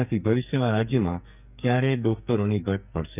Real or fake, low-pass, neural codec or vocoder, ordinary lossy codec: fake; 3.6 kHz; codec, 44.1 kHz, 2.6 kbps, SNAC; none